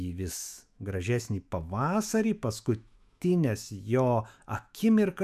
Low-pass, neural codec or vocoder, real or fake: 14.4 kHz; autoencoder, 48 kHz, 128 numbers a frame, DAC-VAE, trained on Japanese speech; fake